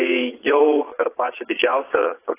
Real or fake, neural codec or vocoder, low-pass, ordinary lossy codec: fake; vocoder, 22.05 kHz, 80 mel bands, Vocos; 3.6 kHz; AAC, 24 kbps